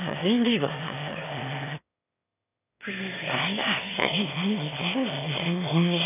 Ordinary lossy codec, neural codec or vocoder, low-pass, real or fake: none; autoencoder, 22.05 kHz, a latent of 192 numbers a frame, VITS, trained on one speaker; 3.6 kHz; fake